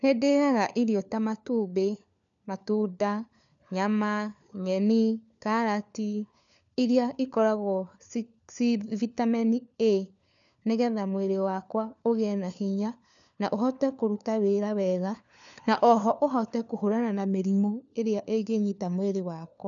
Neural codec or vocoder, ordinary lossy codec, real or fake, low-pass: codec, 16 kHz, 4 kbps, FunCodec, trained on LibriTTS, 50 frames a second; none; fake; 7.2 kHz